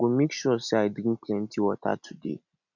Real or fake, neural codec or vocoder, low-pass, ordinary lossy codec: real; none; 7.2 kHz; none